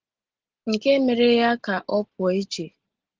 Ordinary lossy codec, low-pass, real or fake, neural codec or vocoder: Opus, 16 kbps; 7.2 kHz; real; none